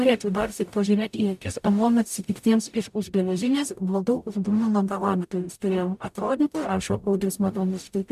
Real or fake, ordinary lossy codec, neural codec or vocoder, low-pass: fake; MP3, 96 kbps; codec, 44.1 kHz, 0.9 kbps, DAC; 14.4 kHz